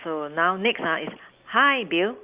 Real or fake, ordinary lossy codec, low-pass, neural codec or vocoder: real; Opus, 24 kbps; 3.6 kHz; none